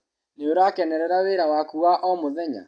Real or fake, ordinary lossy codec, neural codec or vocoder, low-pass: real; AAC, 48 kbps; none; 9.9 kHz